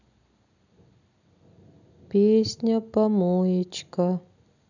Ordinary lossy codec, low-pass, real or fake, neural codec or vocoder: none; 7.2 kHz; real; none